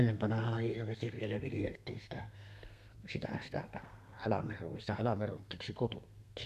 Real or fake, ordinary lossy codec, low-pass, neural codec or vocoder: fake; none; 14.4 kHz; codec, 32 kHz, 1.9 kbps, SNAC